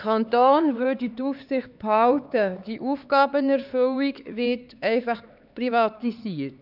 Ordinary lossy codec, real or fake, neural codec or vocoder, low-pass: none; fake; codec, 16 kHz, 4 kbps, X-Codec, HuBERT features, trained on LibriSpeech; 5.4 kHz